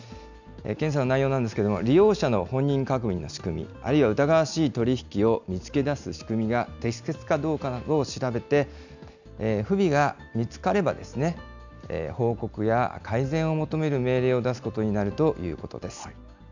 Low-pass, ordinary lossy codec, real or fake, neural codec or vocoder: 7.2 kHz; none; real; none